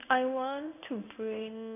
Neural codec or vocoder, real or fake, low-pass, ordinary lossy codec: codec, 16 kHz in and 24 kHz out, 1 kbps, XY-Tokenizer; fake; 3.6 kHz; none